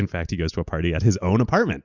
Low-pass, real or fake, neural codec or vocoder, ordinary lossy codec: 7.2 kHz; real; none; Opus, 64 kbps